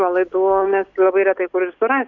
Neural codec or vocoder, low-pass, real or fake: none; 7.2 kHz; real